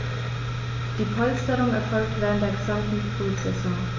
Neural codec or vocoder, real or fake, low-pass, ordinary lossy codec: none; real; 7.2 kHz; MP3, 64 kbps